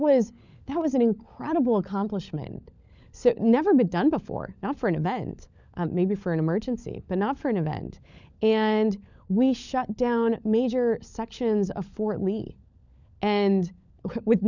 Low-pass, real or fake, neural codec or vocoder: 7.2 kHz; fake; codec, 16 kHz, 16 kbps, FunCodec, trained on LibriTTS, 50 frames a second